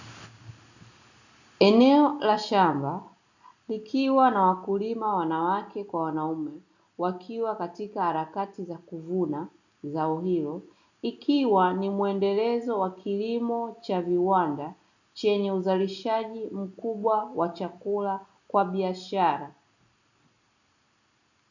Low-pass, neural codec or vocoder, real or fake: 7.2 kHz; none; real